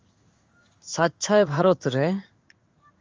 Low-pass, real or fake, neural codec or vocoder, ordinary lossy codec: 7.2 kHz; fake; codec, 16 kHz in and 24 kHz out, 1 kbps, XY-Tokenizer; Opus, 32 kbps